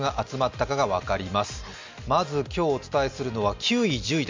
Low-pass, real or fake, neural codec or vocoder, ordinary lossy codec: 7.2 kHz; real; none; MP3, 64 kbps